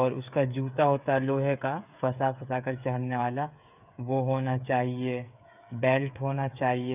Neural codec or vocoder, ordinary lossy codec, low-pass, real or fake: codec, 16 kHz, 8 kbps, FreqCodec, smaller model; none; 3.6 kHz; fake